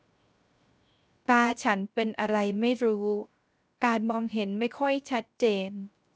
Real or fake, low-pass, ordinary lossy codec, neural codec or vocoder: fake; none; none; codec, 16 kHz, 0.3 kbps, FocalCodec